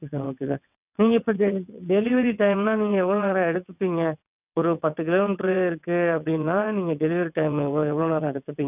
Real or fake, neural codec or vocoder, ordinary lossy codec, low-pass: fake; vocoder, 22.05 kHz, 80 mel bands, WaveNeXt; none; 3.6 kHz